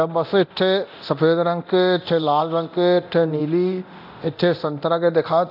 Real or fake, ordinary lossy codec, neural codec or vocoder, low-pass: fake; none; codec, 24 kHz, 0.9 kbps, DualCodec; 5.4 kHz